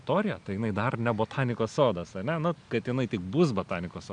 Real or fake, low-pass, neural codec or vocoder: real; 9.9 kHz; none